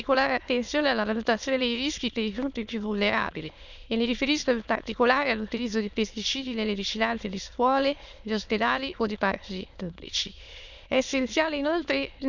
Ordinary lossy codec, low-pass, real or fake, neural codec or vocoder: none; 7.2 kHz; fake; autoencoder, 22.05 kHz, a latent of 192 numbers a frame, VITS, trained on many speakers